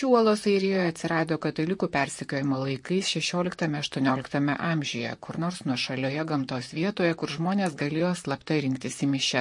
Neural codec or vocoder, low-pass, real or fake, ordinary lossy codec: vocoder, 44.1 kHz, 128 mel bands, Pupu-Vocoder; 10.8 kHz; fake; MP3, 48 kbps